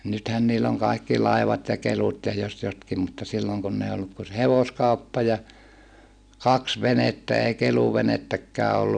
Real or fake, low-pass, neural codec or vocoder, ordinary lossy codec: real; 9.9 kHz; none; none